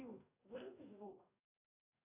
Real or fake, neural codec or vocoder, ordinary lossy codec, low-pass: fake; codec, 44.1 kHz, 2.6 kbps, DAC; AAC, 32 kbps; 3.6 kHz